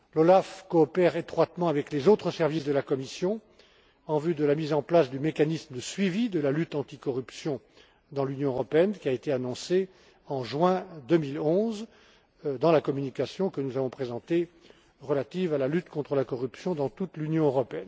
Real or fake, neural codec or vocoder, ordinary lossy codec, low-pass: real; none; none; none